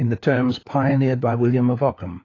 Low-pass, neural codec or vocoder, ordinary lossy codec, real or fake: 7.2 kHz; codec, 16 kHz, 4 kbps, FunCodec, trained on LibriTTS, 50 frames a second; AAC, 32 kbps; fake